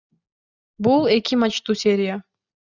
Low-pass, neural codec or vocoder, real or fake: 7.2 kHz; none; real